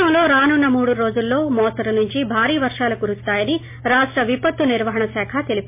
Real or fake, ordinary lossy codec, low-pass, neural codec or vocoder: real; MP3, 24 kbps; 3.6 kHz; none